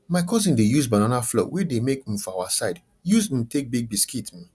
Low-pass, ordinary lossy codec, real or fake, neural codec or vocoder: none; none; real; none